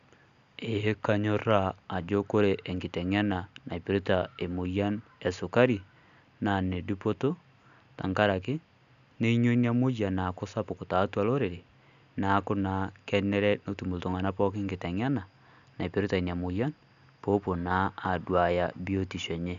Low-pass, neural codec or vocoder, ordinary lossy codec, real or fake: 7.2 kHz; none; none; real